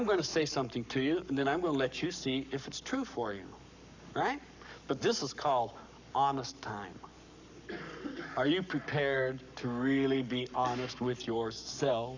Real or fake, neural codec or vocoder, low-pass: fake; codec, 44.1 kHz, 7.8 kbps, Pupu-Codec; 7.2 kHz